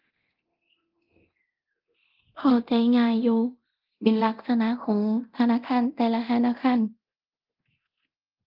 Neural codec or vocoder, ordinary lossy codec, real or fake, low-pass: codec, 24 kHz, 0.9 kbps, DualCodec; Opus, 32 kbps; fake; 5.4 kHz